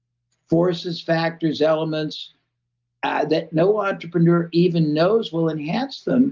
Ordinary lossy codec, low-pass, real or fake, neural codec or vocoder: Opus, 24 kbps; 7.2 kHz; real; none